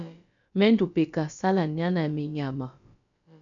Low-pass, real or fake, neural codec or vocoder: 7.2 kHz; fake; codec, 16 kHz, about 1 kbps, DyCAST, with the encoder's durations